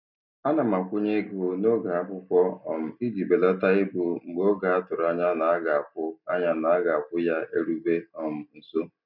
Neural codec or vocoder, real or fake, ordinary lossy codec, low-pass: none; real; none; 5.4 kHz